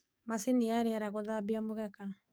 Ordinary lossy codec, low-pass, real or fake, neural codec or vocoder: none; none; fake; codec, 44.1 kHz, 7.8 kbps, DAC